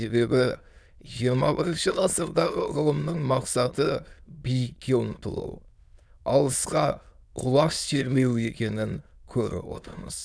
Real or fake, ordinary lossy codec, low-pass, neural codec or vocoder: fake; none; none; autoencoder, 22.05 kHz, a latent of 192 numbers a frame, VITS, trained on many speakers